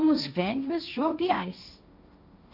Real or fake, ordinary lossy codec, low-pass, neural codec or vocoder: fake; none; 5.4 kHz; codec, 16 kHz, 1.1 kbps, Voila-Tokenizer